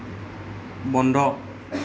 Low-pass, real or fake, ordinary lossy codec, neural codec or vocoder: none; real; none; none